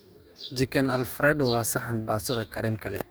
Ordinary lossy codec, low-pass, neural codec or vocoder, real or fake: none; none; codec, 44.1 kHz, 2.6 kbps, DAC; fake